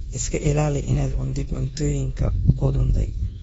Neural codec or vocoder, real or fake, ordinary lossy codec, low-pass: codec, 24 kHz, 1.2 kbps, DualCodec; fake; AAC, 24 kbps; 10.8 kHz